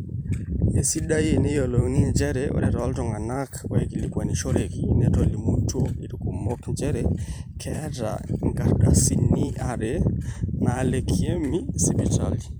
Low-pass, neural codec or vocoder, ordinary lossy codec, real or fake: none; vocoder, 44.1 kHz, 128 mel bands every 512 samples, BigVGAN v2; none; fake